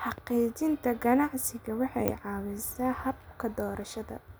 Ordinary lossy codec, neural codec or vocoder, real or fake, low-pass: none; none; real; none